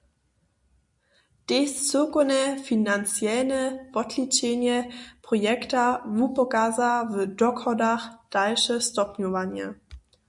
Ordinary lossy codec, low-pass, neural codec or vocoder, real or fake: MP3, 96 kbps; 10.8 kHz; none; real